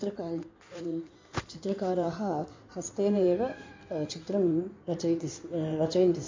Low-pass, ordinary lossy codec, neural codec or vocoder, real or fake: 7.2 kHz; MP3, 48 kbps; codec, 16 kHz in and 24 kHz out, 2.2 kbps, FireRedTTS-2 codec; fake